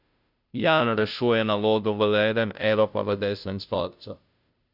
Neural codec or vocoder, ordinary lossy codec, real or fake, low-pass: codec, 16 kHz, 0.5 kbps, FunCodec, trained on Chinese and English, 25 frames a second; none; fake; 5.4 kHz